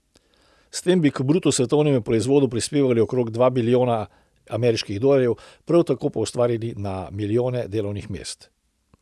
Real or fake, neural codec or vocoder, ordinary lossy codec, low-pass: real; none; none; none